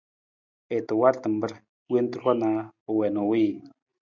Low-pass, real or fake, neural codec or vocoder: 7.2 kHz; real; none